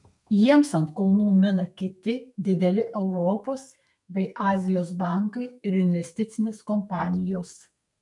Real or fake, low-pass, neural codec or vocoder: fake; 10.8 kHz; codec, 32 kHz, 1.9 kbps, SNAC